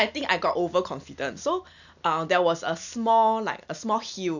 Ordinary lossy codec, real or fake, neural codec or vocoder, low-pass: none; real; none; 7.2 kHz